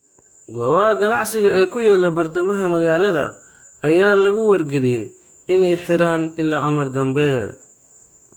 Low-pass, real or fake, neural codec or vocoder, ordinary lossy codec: 19.8 kHz; fake; codec, 44.1 kHz, 2.6 kbps, DAC; none